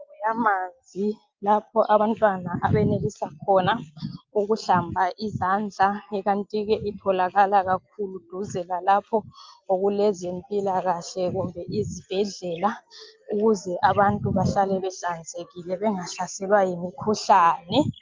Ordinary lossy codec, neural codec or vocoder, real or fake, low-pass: Opus, 32 kbps; none; real; 7.2 kHz